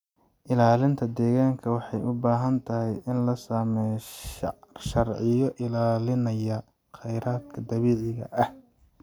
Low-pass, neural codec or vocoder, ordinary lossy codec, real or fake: 19.8 kHz; none; none; real